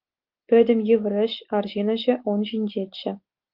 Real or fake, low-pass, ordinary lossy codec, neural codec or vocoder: real; 5.4 kHz; Opus, 24 kbps; none